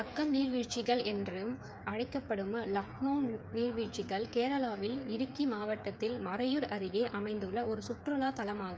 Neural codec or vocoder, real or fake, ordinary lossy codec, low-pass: codec, 16 kHz, 8 kbps, FreqCodec, smaller model; fake; none; none